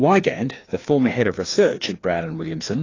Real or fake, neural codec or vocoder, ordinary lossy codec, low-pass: fake; codec, 16 kHz, 2 kbps, FreqCodec, larger model; AAC, 32 kbps; 7.2 kHz